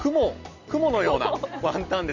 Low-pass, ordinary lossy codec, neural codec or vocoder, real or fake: 7.2 kHz; none; none; real